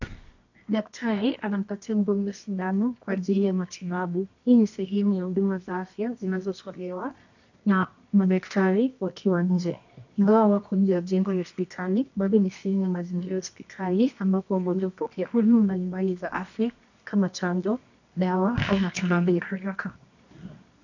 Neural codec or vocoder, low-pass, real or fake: codec, 24 kHz, 0.9 kbps, WavTokenizer, medium music audio release; 7.2 kHz; fake